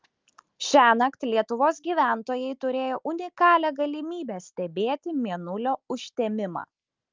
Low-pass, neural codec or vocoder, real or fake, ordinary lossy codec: 7.2 kHz; none; real; Opus, 24 kbps